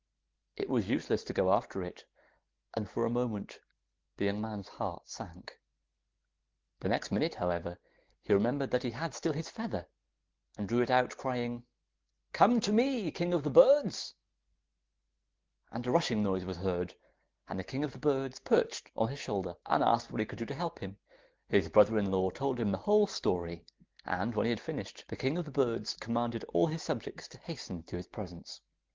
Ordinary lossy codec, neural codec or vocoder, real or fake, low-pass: Opus, 16 kbps; none; real; 7.2 kHz